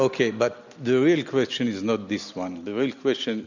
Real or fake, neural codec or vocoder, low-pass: real; none; 7.2 kHz